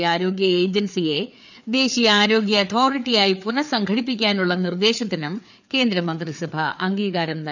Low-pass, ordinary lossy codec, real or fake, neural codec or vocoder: 7.2 kHz; none; fake; codec, 16 kHz, 4 kbps, FreqCodec, larger model